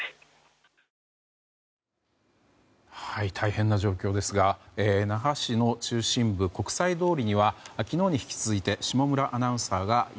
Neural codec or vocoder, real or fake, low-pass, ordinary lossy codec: none; real; none; none